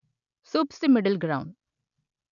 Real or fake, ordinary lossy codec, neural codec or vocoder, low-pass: real; none; none; 7.2 kHz